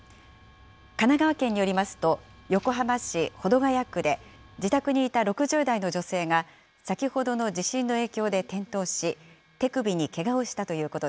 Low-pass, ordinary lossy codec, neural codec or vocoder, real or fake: none; none; none; real